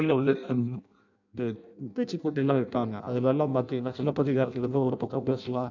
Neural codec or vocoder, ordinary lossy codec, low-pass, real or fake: codec, 16 kHz in and 24 kHz out, 0.6 kbps, FireRedTTS-2 codec; none; 7.2 kHz; fake